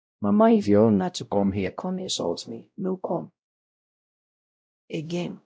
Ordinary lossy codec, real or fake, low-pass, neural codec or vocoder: none; fake; none; codec, 16 kHz, 0.5 kbps, X-Codec, WavLM features, trained on Multilingual LibriSpeech